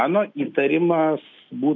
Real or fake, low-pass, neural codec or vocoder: real; 7.2 kHz; none